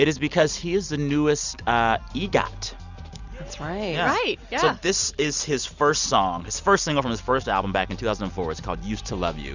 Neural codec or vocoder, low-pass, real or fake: none; 7.2 kHz; real